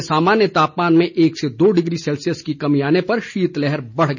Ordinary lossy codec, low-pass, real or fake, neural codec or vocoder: none; 7.2 kHz; real; none